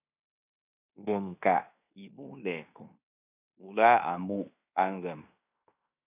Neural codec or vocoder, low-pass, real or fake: codec, 16 kHz in and 24 kHz out, 0.9 kbps, LongCat-Audio-Codec, fine tuned four codebook decoder; 3.6 kHz; fake